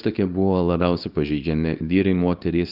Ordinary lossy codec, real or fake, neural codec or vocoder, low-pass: Opus, 24 kbps; fake; codec, 24 kHz, 0.9 kbps, WavTokenizer, small release; 5.4 kHz